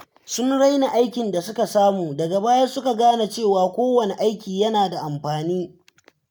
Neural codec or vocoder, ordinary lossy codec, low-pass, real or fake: none; none; none; real